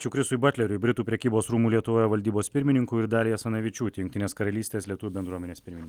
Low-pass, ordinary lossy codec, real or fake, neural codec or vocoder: 19.8 kHz; Opus, 24 kbps; real; none